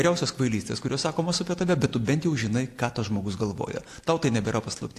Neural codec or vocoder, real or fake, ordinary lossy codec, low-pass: none; real; AAC, 64 kbps; 14.4 kHz